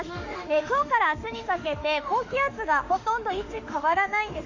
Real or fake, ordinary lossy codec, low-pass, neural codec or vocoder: fake; none; 7.2 kHz; autoencoder, 48 kHz, 32 numbers a frame, DAC-VAE, trained on Japanese speech